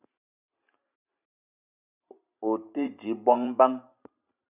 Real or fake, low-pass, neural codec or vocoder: fake; 3.6 kHz; vocoder, 44.1 kHz, 128 mel bands every 512 samples, BigVGAN v2